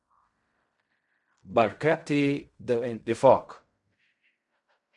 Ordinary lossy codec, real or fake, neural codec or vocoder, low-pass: MP3, 64 kbps; fake; codec, 16 kHz in and 24 kHz out, 0.4 kbps, LongCat-Audio-Codec, fine tuned four codebook decoder; 10.8 kHz